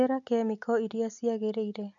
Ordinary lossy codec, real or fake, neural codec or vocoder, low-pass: none; real; none; 7.2 kHz